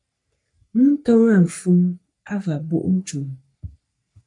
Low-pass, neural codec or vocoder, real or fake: 10.8 kHz; codec, 44.1 kHz, 3.4 kbps, Pupu-Codec; fake